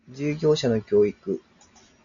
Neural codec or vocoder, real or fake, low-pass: none; real; 7.2 kHz